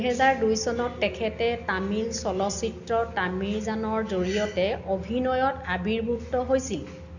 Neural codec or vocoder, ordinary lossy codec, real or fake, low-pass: none; none; real; 7.2 kHz